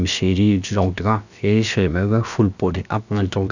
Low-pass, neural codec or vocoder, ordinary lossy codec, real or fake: 7.2 kHz; codec, 16 kHz, about 1 kbps, DyCAST, with the encoder's durations; none; fake